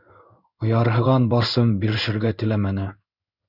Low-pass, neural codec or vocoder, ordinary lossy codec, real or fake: 5.4 kHz; codec, 16 kHz in and 24 kHz out, 1 kbps, XY-Tokenizer; Opus, 64 kbps; fake